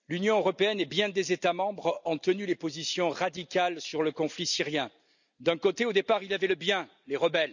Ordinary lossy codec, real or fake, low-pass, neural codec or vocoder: none; real; 7.2 kHz; none